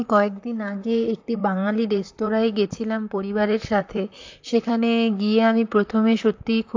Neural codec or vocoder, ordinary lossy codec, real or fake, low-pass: vocoder, 44.1 kHz, 128 mel bands, Pupu-Vocoder; AAC, 48 kbps; fake; 7.2 kHz